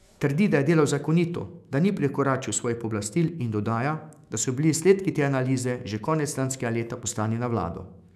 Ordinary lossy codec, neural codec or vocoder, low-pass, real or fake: none; autoencoder, 48 kHz, 128 numbers a frame, DAC-VAE, trained on Japanese speech; 14.4 kHz; fake